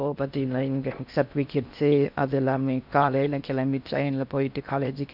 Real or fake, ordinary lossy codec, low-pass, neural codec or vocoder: fake; none; 5.4 kHz; codec, 16 kHz in and 24 kHz out, 0.6 kbps, FocalCodec, streaming, 4096 codes